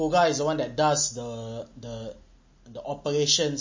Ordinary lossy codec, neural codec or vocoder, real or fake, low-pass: MP3, 32 kbps; none; real; 7.2 kHz